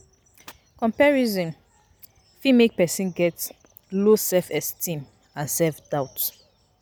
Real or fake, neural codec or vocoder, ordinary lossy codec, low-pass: real; none; none; none